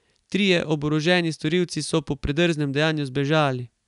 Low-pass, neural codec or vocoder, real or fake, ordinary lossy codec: 10.8 kHz; none; real; none